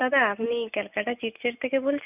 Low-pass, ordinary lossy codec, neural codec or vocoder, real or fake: 3.6 kHz; none; none; real